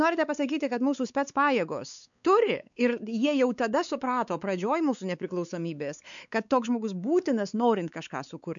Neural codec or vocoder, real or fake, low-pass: codec, 16 kHz, 4 kbps, X-Codec, WavLM features, trained on Multilingual LibriSpeech; fake; 7.2 kHz